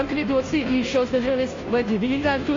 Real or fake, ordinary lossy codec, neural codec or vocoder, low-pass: fake; AAC, 32 kbps; codec, 16 kHz, 0.5 kbps, FunCodec, trained on Chinese and English, 25 frames a second; 7.2 kHz